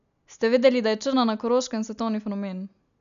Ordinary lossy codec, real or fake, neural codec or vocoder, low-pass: none; real; none; 7.2 kHz